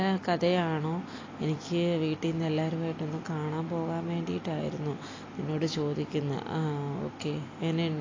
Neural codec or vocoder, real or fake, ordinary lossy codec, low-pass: none; real; AAC, 32 kbps; 7.2 kHz